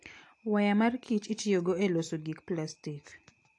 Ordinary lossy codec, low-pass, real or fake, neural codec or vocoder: AAC, 48 kbps; 10.8 kHz; real; none